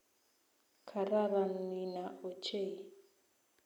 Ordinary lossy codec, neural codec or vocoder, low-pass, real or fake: none; none; 19.8 kHz; real